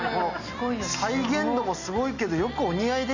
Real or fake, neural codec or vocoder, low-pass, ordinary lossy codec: real; none; 7.2 kHz; none